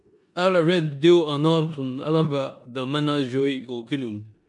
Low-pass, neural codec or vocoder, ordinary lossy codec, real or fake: 10.8 kHz; codec, 16 kHz in and 24 kHz out, 0.9 kbps, LongCat-Audio-Codec, four codebook decoder; MP3, 64 kbps; fake